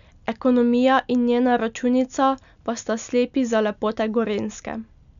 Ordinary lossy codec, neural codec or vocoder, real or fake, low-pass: none; none; real; 7.2 kHz